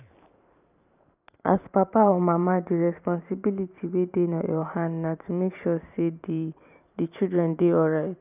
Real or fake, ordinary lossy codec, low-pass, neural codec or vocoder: fake; none; 3.6 kHz; vocoder, 44.1 kHz, 128 mel bands every 512 samples, BigVGAN v2